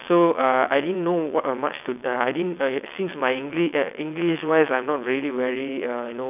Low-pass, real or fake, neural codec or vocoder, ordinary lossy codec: 3.6 kHz; fake; vocoder, 22.05 kHz, 80 mel bands, WaveNeXt; none